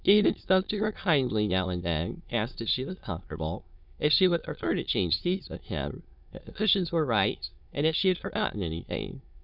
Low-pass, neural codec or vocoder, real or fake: 5.4 kHz; autoencoder, 22.05 kHz, a latent of 192 numbers a frame, VITS, trained on many speakers; fake